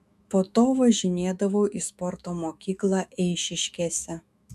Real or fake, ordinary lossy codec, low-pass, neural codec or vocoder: fake; MP3, 96 kbps; 14.4 kHz; autoencoder, 48 kHz, 128 numbers a frame, DAC-VAE, trained on Japanese speech